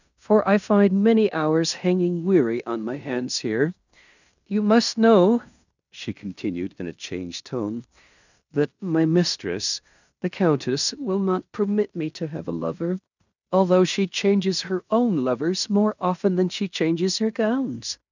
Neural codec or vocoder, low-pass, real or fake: codec, 16 kHz in and 24 kHz out, 0.9 kbps, LongCat-Audio-Codec, fine tuned four codebook decoder; 7.2 kHz; fake